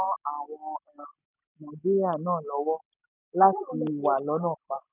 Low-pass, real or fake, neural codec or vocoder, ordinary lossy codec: 3.6 kHz; real; none; none